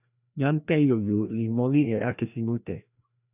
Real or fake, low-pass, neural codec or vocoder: fake; 3.6 kHz; codec, 16 kHz, 1 kbps, FreqCodec, larger model